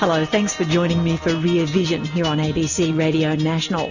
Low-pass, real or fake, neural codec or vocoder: 7.2 kHz; real; none